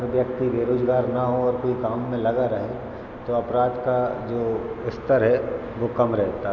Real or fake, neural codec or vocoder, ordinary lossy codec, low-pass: real; none; none; 7.2 kHz